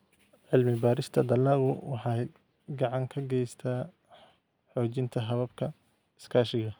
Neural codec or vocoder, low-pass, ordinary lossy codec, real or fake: none; none; none; real